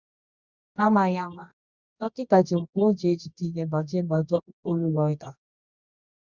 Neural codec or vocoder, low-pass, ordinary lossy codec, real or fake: codec, 24 kHz, 0.9 kbps, WavTokenizer, medium music audio release; 7.2 kHz; Opus, 64 kbps; fake